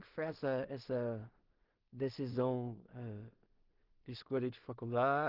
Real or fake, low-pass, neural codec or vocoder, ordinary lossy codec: fake; 5.4 kHz; codec, 16 kHz in and 24 kHz out, 0.4 kbps, LongCat-Audio-Codec, two codebook decoder; Opus, 16 kbps